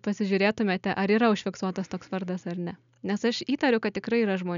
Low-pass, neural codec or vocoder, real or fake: 7.2 kHz; none; real